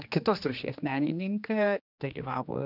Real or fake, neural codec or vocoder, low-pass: fake; codec, 16 kHz, 2 kbps, X-Codec, HuBERT features, trained on general audio; 5.4 kHz